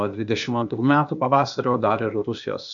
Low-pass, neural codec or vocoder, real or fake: 7.2 kHz; codec, 16 kHz, 0.8 kbps, ZipCodec; fake